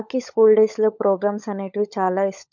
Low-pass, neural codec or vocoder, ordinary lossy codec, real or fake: 7.2 kHz; codec, 16 kHz, 16 kbps, FunCodec, trained on LibriTTS, 50 frames a second; none; fake